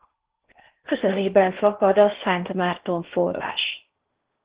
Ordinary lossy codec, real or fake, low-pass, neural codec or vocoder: Opus, 16 kbps; fake; 3.6 kHz; codec, 16 kHz in and 24 kHz out, 0.8 kbps, FocalCodec, streaming, 65536 codes